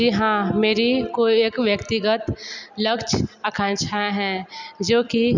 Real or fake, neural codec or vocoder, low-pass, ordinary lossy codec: real; none; 7.2 kHz; none